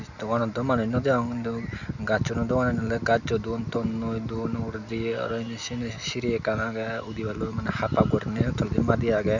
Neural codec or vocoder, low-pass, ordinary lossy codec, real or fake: none; 7.2 kHz; none; real